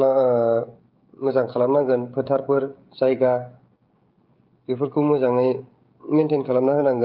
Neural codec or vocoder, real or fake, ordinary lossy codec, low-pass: codec, 16 kHz, 16 kbps, FreqCodec, smaller model; fake; Opus, 32 kbps; 5.4 kHz